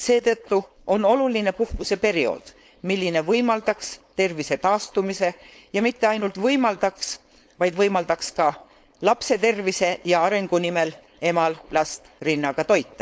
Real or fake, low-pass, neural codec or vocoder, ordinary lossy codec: fake; none; codec, 16 kHz, 4.8 kbps, FACodec; none